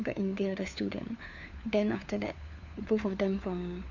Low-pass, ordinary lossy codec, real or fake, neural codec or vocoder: 7.2 kHz; none; fake; codec, 16 kHz, 4 kbps, FunCodec, trained on LibriTTS, 50 frames a second